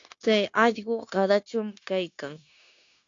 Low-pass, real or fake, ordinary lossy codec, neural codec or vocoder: 7.2 kHz; fake; AAC, 48 kbps; codec, 16 kHz, 0.9 kbps, LongCat-Audio-Codec